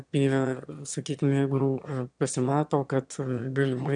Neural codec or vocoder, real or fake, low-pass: autoencoder, 22.05 kHz, a latent of 192 numbers a frame, VITS, trained on one speaker; fake; 9.9 kHz